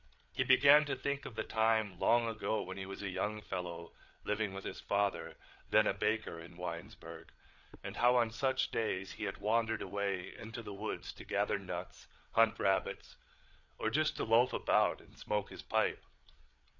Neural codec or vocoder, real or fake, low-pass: codec, 16 kHz, 8 kbps, FreqCodec, larger model; fake; 7.2 kHz